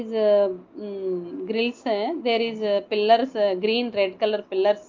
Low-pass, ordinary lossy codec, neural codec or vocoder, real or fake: 7.2 kHz; Opus, 24 kbps; none; real